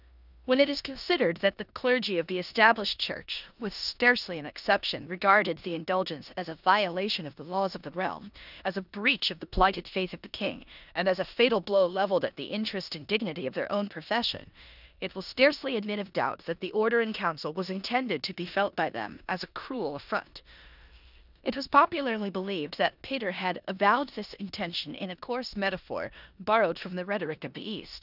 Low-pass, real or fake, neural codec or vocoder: 5.4 kHz; fake; codec, 16 kHz in and 24 kHz out, 0.9 kbps, LongCat-Audio-Codec, four codebook decoder